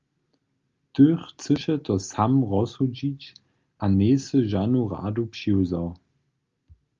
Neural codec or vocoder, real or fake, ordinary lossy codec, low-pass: none; real; Opus, 32 kbps; 7.2 kHz